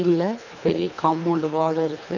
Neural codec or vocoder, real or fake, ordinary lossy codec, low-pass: codec, 24 kHz, 3 kbps, HILCodec; fake; none; 7.2 kHz